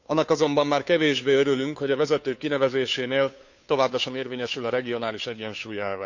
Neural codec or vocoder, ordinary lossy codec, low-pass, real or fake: codec, 16 kHz, 2 kbps, FunCodec, trained on Chinese and English, 25 frames a second; none; 7.2 kHz; fake